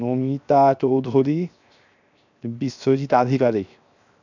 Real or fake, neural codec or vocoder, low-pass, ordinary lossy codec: fake; codec, 16 kHz, 0.7 kbps, FocalCodec; 7.2 kHz; none